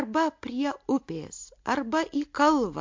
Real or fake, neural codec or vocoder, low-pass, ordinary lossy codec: real; none; 7.2 kHz; MP3, 48 kbps